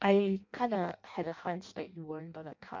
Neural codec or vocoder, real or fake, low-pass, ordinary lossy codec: codec, 16 kHz in and 24 kHz out, 0.6 kbps, FireRedTTS-2 codec; fake; 7.2 kHz; MP3, 48 kbps